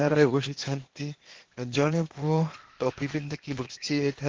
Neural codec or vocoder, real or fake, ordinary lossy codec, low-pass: codec, 16 kHz, 0.8 kbps, ZipCodec; fake; Opus, 16 kbps; 7.2 kHz